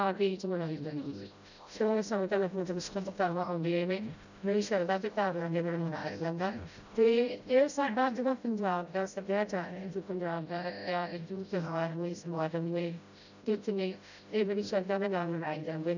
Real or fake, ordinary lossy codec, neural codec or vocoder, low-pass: fake; none; codec, 16 kHz, 0.5 kbps, FreqCodec, smaller model; 7.2 kHz